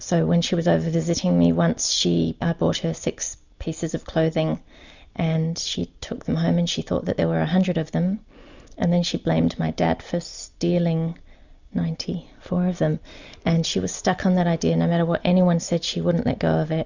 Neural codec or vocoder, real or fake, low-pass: none; real; 7.2 kHz